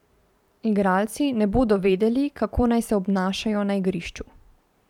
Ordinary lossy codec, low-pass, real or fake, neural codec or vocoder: none; 19.8 kHz; fake; vocoder, 44.1 kHz, 128 mel bands every 512 samples, BigVGAN v2